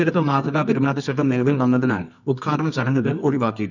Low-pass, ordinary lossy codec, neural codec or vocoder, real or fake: 7.2 kHz; none; codec, 24 kHz, 0.9 kbps, WavTokenizer, medium music audio release; fake